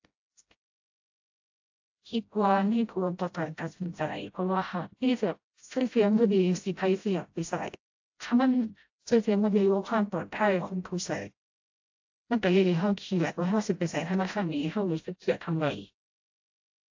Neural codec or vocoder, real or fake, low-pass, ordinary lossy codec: codec, 16 kHz, 0.5 kbps, FreqCodec, smaller model; fake; 7.2 kHz; AAC, 48 kbps